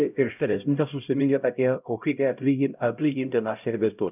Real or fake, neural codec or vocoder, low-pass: fake; codec, 16 kHz, 0.5 kbps, X-Codec, HuBERT features, trained on LibriSpeech; 3.6 kHz